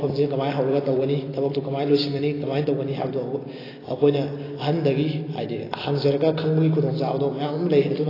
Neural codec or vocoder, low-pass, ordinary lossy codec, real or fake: none; 5.4 kHz; AAC, 24 kbps; real